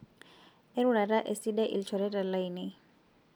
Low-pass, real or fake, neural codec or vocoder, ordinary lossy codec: none; real; none; none